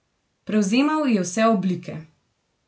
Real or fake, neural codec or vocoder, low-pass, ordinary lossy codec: real; none; none; none